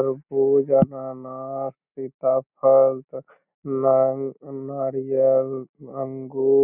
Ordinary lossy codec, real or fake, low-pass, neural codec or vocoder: none; real; 3.6 kHz; none